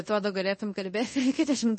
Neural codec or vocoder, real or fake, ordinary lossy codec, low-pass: codec, 24 kHz, 0.5 kbps, DualCodec; fake; MP3, 32 kbps; 10.8 kHz